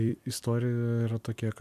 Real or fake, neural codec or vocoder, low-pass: fake; vocoder, 44.1 kHz, 128 mel bands every 256 samples, BigVGAN v2; 14.4 kHz